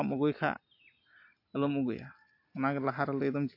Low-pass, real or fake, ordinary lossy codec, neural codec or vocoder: 5.4 kHz; real; AAC, 32 kbps; none